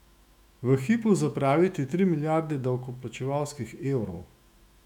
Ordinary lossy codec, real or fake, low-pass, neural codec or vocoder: none; fake; 19.8 kHz; autoencoder, 48 kHz, 128 numbers a frame, DAC-VAE, trained on Japanese speech